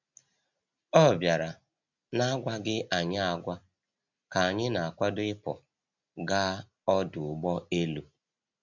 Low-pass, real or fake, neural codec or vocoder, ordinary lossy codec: 7.2 kHz; real; none; none